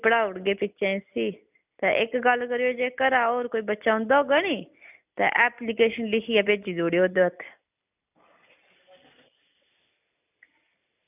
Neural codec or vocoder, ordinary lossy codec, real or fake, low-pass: none; none; real; 3.6 kHz